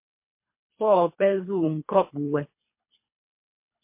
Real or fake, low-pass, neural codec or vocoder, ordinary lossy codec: fake; 3.6 kHz; codec, 24 kHz, 3 kbps, HILCodec; MP3, 24 kbps